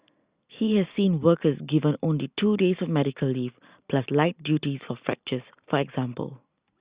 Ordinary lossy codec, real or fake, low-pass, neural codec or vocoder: Opus, 64 kbps; real; 3.6 kHz; none